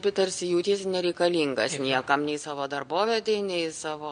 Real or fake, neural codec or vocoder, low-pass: real; none; 9.9 kHz